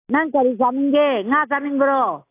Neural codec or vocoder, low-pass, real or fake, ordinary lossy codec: none; 3.6 kHz; real; AAC, 24 kbps